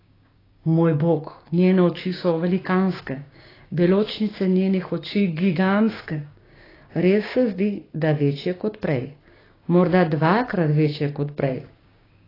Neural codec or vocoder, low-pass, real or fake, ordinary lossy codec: codec, 16 kHz, 6 kbps, DAC; 5.4 kHz; fake; AAC, 24 kbps